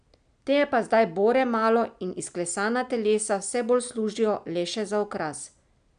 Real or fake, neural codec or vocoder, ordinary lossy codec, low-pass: real; none; none; 9.9 kHz